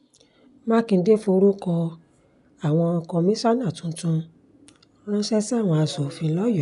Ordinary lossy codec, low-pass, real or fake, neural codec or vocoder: none; 10.8 kHz; real; none